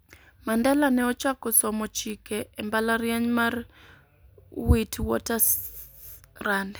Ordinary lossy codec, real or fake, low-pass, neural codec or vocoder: none; real; none; none